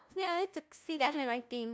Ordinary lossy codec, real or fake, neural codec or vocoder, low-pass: none; fake; codec, 16 kHz, 0.5 kbps, FunCodec, trained on LibriTTS, 25 frames a second; none